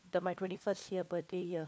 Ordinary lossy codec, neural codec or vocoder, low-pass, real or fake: none; codec, 16 kHz, 4 kbps, FunCodec, trained on LibriTTS, 50 frames a second; none; fake